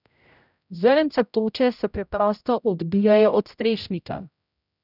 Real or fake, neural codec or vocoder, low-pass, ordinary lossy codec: fake; codec, 16 kHz, 0.5 kbps, X-Codec, HuBERT features, trained on general audio; 5.4 kHz; none